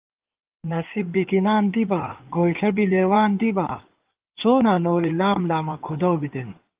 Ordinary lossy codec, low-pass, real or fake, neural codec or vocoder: Opus, 32 kbps; 3.6 kHz; fake; codec, 16 kHz in and 24 kHz out, 2.2 kbps, FireRedTTS-2 codec